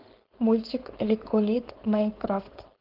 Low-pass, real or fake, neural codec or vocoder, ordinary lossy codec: 5.4 kHz; fake; codec, 16 kHz, 4.8 kbps, FACodec; Opus, 16 kbps